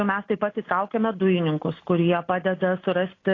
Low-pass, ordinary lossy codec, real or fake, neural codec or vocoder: 7.2 kHz; AAC, 32 kbps; real; none